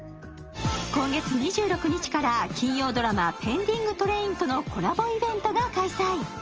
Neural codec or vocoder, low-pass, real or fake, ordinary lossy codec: none; 7.2 kHz; real; Opus, 24 kbps